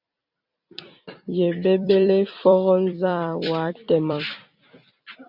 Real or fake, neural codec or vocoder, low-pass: fake; vocoder, 44.1 kHz, 128 mel bands every 256 samples, BigVGAN v2; 5.4 kHz